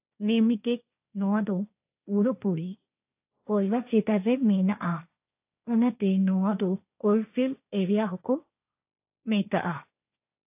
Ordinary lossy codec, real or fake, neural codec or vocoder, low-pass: AAC, 32 kbps; fake; codec, 16 kHz, 1.1 kbps, Voila-Tokenizer; 3.6 kHz